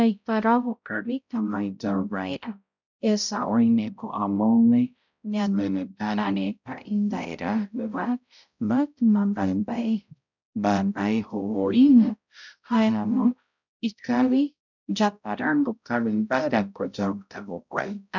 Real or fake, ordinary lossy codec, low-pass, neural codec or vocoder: fake; none; 7.2 kHz; codec, 16 kHz, 0.5 kbps, X-Codec, HuBERT features, trained on balanced general audio